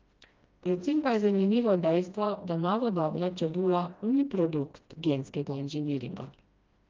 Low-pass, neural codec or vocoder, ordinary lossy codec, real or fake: 7.2 kHz; codec, 16 kHz, 1 kbps, FreqCodec, smaller model; Opus, 24 kbps; fake